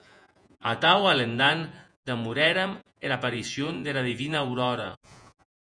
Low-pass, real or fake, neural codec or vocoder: 9.9 kHz; fake; vocoder, 48 kHz, 128 mel bands, Vocos